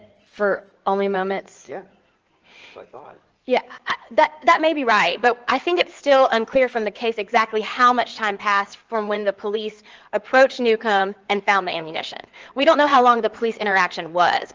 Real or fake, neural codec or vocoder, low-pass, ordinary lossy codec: fake; codec, 16 kHz in and 24 kHz out, 2.2 kbps, FireRedTTS-2 codec; 7.2 kHz; Opus, 24 kbps